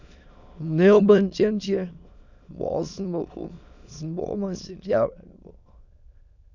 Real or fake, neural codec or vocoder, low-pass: fake; autoencoder, 22.05 kHz, a latent of 192 numbers a frame, VITS, trained on many speakers; 7.2 kHz